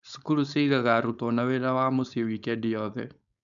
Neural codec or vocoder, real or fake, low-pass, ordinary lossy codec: codec, 16 kHz, 4.8 kbps, FACodec; fake; 7.2 kHz; none